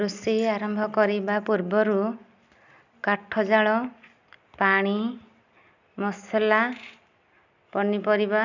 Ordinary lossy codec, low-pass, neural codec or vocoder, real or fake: none; 7.2 kHz; none; real